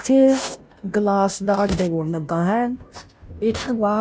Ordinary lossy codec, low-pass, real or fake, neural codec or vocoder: none; none; fake; codec, 16 kHz, 0.5 kbps, FunCodec, trained on Chinese and English, 25 frames a second